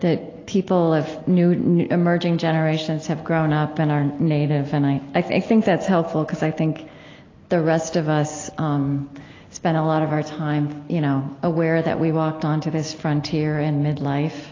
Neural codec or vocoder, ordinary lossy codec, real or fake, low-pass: none; AAC, 32 kbps; real; 7.2 kHz